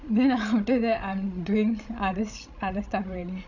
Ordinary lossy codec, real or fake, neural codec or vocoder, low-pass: none; fake; codec, 16 kHz, 16 kbps, FreqCodec, larger model; 7.2 kHz